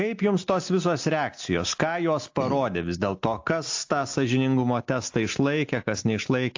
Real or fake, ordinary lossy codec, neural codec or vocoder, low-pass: real; AAC, 48 kbps; none; 7.2 kHz